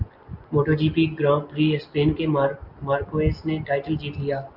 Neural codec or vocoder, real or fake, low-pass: none; real; 5.4 kHz